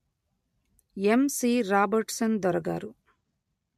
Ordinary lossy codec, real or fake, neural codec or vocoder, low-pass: MP3, 64 kbps; real; none; 14.4 kHz